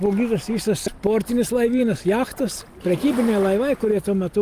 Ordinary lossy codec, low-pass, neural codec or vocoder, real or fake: Opus, 32 kbps; 14.4 kHz; none; real